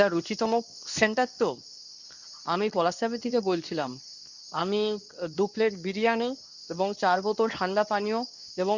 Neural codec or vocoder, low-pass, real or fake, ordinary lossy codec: codec, 24 kHz, 0.9 kbps, WavTokenizer, medium speech release version 2; 7.2 kHz; fake; none